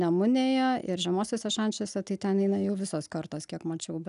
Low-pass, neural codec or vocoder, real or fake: 10.8 kHz; none; real